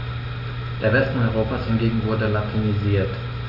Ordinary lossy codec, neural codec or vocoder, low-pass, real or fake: none; none; 5.4 kHz; real